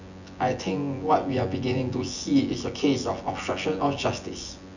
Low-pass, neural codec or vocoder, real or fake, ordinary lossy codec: 7.2 kHz; vocoder, 24 kHz, 100 mel bands, Vocos; fake; none